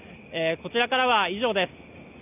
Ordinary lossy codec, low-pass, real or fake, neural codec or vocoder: none; 3.6 kHz; real; none